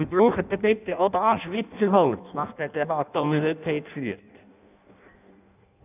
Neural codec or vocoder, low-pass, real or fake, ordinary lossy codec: codec, 16 kHz in and 24 kHz out, 0.6 kbps, FireRedTTS-2 codec; 3.6 kHz; fake; none